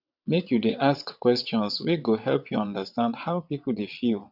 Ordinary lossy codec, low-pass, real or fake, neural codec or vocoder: none; 5.4 kHz; fake; vocoder, 22.05 kHz, 80 mel bands, WaveNeXt